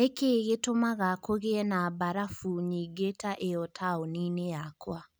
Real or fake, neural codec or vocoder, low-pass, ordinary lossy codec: real; none; none; none